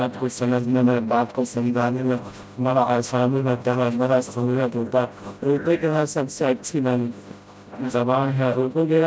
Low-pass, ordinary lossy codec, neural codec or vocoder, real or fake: none; none; codec, 16 kHz, 0.5 kbps, FreqCodec, smaller model; fake